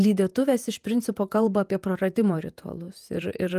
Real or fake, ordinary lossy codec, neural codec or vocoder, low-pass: fake; Opus, 32 kbps; vocoder, 48 kHz, 128 mel bands, Vocos; 14.4 kHz